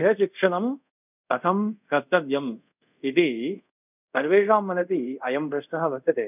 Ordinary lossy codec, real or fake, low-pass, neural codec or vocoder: none; fake; 3.6 kHz; codec, 24 kHz, 0.5 kbps, DualCodec